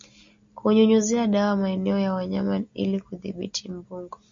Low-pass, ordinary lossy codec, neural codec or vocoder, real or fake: 7.2 kHz; MP3, 48 kbps; none; real